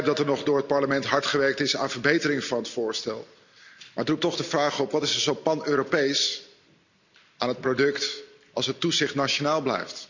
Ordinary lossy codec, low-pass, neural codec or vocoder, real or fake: none; 7.2 kHz; none; real